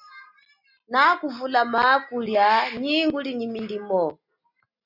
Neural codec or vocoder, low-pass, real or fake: none; 5.4 kHz; real